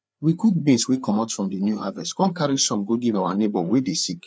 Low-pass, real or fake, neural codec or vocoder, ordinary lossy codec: none; fake; codec, 16 kHz, 4 kbps, FreqCodec, larger model; none